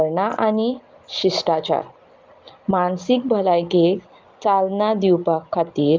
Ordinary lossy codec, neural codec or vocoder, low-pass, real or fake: Opus, 32 kbps; none; 7.2 kHz; real